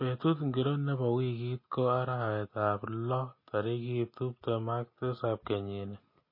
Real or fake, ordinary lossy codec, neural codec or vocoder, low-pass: real; MP3, 24 kbps; none; 5.4 kHz